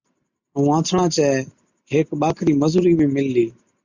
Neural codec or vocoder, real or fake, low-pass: none; real; 7.2 kHz